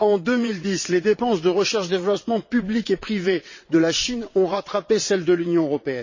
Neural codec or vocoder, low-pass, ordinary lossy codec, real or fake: vocoder, 22.05 kHz, 80 mel bands, WaveNeXt; 7.2 kHz; MP3, 32 kbps; fake